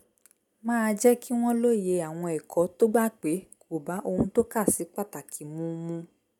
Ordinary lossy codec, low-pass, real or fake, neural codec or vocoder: none; 19.8 kHz; real; none